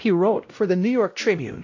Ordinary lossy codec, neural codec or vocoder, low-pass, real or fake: AAC, 48 kbps; codec, 16 kHz, 0.5 kbps, X-Codec, WavLM features, trained on Multilingual LibriSpeech; 7.2 kHz; fake